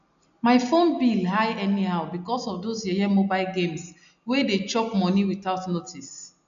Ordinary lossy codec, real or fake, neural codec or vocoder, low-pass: none; real; none; 7.2 kHz